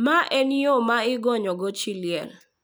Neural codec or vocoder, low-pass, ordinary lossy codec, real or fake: none; none; none; real